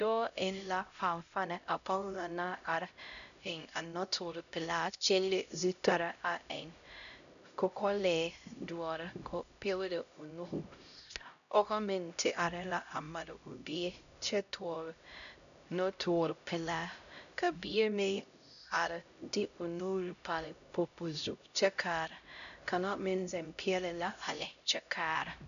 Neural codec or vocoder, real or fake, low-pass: codec, 16 kHz, 0.5 kbps, X-Codec, HuBERT features, trained on LibriSpeech; fake; 7.2 kHz